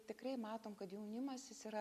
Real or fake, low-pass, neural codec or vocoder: real; 14.4 kHz; none